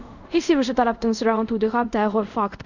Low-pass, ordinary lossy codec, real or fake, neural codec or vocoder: 7.2 kHz; none; fake; codec, 24 kHz, 0.5 kbps, DualCodec